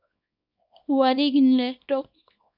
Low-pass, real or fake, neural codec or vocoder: 5.4 kHz; fake; codec, 24 kHz, 0.9 kbps, WavTokenizer, small release